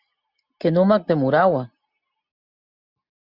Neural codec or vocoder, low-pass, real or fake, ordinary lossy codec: none; 5.4 kHz; real; Opus, 64 kbps